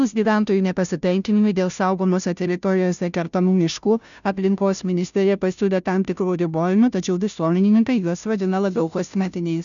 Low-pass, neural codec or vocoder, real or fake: 7.2 kHz; codec, 16 kHz, 0.5 kbps, FunCodec, trained on Chinese and English, 25 frames a second; fake